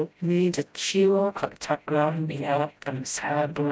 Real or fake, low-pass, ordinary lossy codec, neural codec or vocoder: fake; none; none; codec, 16 kHz, 0.5 kbps, FreqCodec, smaller model